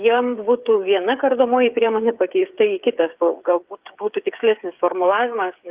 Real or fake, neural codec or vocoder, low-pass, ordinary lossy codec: fake; codec, 16 kHz, 8 kbps, FreqCodec, smaller model; 3.6 kHz; Opus, 32 kbps